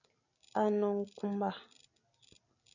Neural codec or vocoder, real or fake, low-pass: none; real; 7.2 kHz